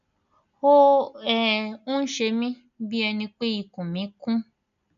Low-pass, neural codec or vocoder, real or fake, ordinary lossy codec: 7.2 kHz; none; real; none